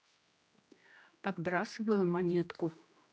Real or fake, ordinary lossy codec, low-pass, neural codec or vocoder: fake; none; none; codec, 16 kHz, 1 kbps, X-Codec, HuBERT features, trained on general audio